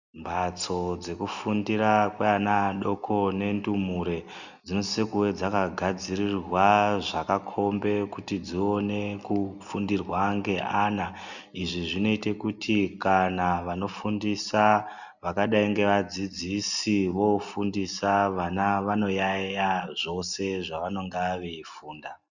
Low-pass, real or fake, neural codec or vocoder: 7.2 kHz; real; none